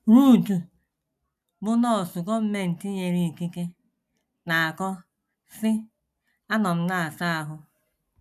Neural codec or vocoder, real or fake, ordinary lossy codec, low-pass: none; real; none; 14.4 kHz